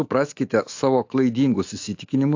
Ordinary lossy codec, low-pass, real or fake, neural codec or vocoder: AAC, 48 kbps; 7.2 kHz; fake; autoencoder, 48 kHz, 128 numbers a frame, DAC-VAE, trained on Japanese speech